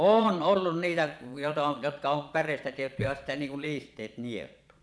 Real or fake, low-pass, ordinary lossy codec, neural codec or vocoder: fake; none; none; vocoder, 22.05 kHz, 80 mel bands, Vocos